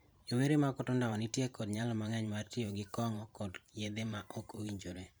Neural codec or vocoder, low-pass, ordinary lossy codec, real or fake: vocoder, 44.1 kHz, 128 mel bands every 512 samples, BigVGAN v2; none; none; fake